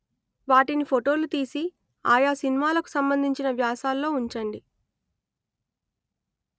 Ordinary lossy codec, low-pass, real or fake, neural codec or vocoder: none; none; real; none